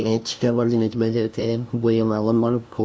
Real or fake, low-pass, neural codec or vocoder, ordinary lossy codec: fake; none; codec, 16 kHz, 0.5 kbps, FunCodec, trained on LibriTTS, 25 frames a second; none